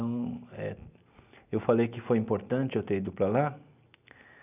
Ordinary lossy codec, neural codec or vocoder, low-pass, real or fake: none; none; 3.6 kHz; real